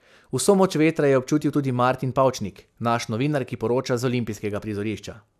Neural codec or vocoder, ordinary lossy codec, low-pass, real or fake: none; none; 14.4 kHz; real